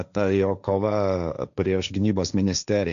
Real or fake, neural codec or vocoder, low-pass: fake; codec, 16 kHz, 1.1 kbps, Voila-Tokenizer; 7.2 kHz